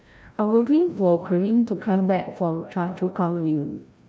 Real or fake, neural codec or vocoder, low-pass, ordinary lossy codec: fake; codec, 16 kHz, 0.5 kbps, FreqCodec, larger model; none; none